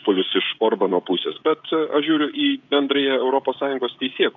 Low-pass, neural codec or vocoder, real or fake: 7.2 kHz; codec, 16 kHz, 16 kbps, FreqCodec, smaller model; fake